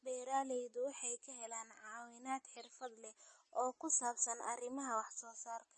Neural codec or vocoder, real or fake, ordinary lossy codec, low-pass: none; real; MP3, 32 kbps; 10.8 kHz